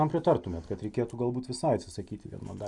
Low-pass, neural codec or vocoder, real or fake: 10.8 kHz; none; real